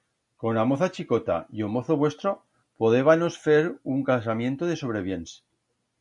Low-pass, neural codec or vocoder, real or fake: 10.8 kHz; none; real